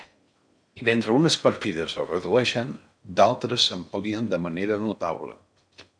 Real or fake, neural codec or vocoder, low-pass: fake; codec, 16 kHz in and 24 kHz out, 0.6 kbps, FocalCodec, streaming, 4096 codes; 9.9 kHz